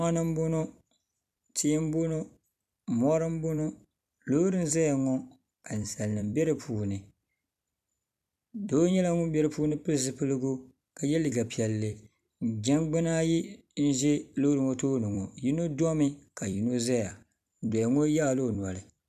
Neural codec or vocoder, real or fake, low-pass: vocoder, 44.1 kHz, 128 mel bands every 256 samples, BigVGAN v2; fake; 14.4 kHz